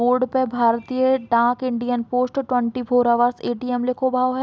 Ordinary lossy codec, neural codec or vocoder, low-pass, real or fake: none; none; none; real